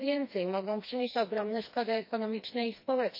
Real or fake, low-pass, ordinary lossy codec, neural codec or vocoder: fake; 5.4 kHz; MP3, 32 kbps; codec, 16 kHz, 2 kbps, FreqCodec, smaller model